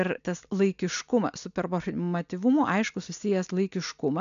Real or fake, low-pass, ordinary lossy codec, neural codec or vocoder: real; 7.2 kHz; MP3, 96 kbps; none